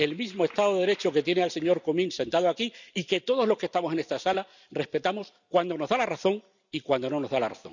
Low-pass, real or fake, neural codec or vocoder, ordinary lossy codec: 7.2 kHz; real; none; none